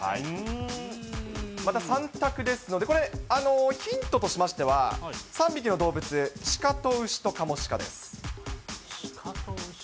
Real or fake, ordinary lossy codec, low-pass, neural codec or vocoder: real; none; none; none